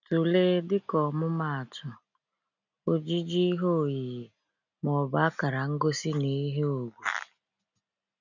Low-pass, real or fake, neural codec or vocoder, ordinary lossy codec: 7.2 kHz; real; none; none